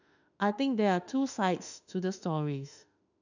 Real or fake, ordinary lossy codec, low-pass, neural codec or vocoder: fake; none; 7.2 kHz; autoencoder, 48 kHz, 32 numbers a frame, DAC-VAE, trained on Japanese speech